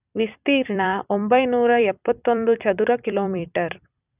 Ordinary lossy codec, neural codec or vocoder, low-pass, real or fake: none; vocoder, 44.1 kHz, 128 mel bands every 256 samples, BigVGAN v2; 3.6 kHz; fake